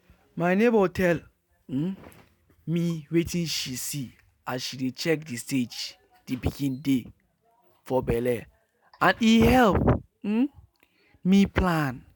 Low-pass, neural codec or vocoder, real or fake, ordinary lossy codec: none; none; real; none